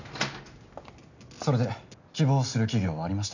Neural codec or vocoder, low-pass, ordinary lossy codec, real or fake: none; 7.2 kHz; none; real